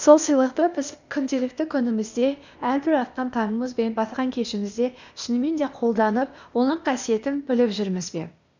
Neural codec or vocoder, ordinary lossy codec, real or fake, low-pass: codec, 16 kHz, 0.8 kbps, ZipCodec; none; fake; 7.2 kHz